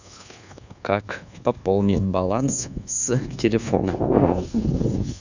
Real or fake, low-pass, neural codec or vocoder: fake; 7.2 kHz; codec, 24 kHz, 1.2 kbps, DualCodec